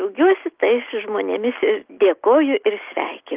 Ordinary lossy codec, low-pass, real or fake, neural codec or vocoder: Opus, 32 kbps; 3.6 kHz; real; none